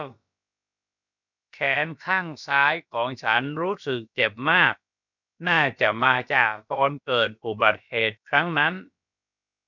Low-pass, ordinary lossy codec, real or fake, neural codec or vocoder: 7.2 kHz; none; fake; codec, 16 kHz, about 1 kbps, DyCAST, with the encoder's durations